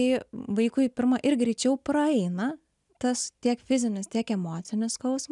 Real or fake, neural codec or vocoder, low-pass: real; none; 10.8 kHz